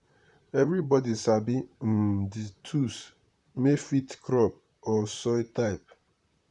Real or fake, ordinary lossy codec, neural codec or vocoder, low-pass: fake; none; vocoder, 44.1 kHz, 128 mel bands, Pupu-Vocoder; 10.8 kHz